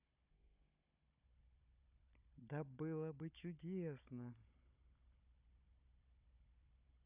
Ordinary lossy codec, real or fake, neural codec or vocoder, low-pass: none; fake; codec, 16 kHz, 16 kbps, FunCodec, trained on Chinese and English, 50 frames a second; 3.6 kHz